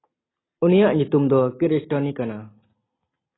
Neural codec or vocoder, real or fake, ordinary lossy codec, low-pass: none; real; AAC, 16 kbps; 7.2 kHz